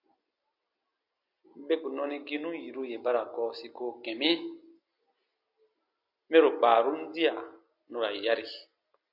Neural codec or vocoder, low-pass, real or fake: none; 5.4 kHz; real